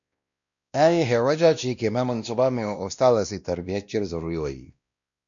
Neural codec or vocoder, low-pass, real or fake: codec, 16 kHz, 1 kbps, X-Codec, WavLM features, trained on Multilingual LibriSpeech; 7.2 kHz; fake